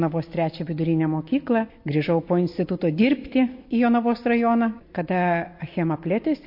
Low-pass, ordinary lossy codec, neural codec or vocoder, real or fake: 5.4 kHz; MP3, 32 kbps; none; real